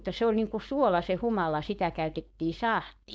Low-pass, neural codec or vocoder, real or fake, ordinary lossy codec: none; codec, 16 kHz, 4.8 kbps, FACodec; fake; none